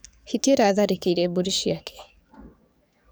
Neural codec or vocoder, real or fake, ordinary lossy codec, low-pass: codec, 44.1 kHz, 7.8 kbps, DAC; fake; none; none